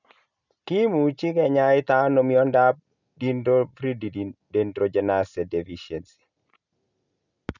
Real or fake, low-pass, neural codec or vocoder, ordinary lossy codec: real; 7.2 kHz; none; none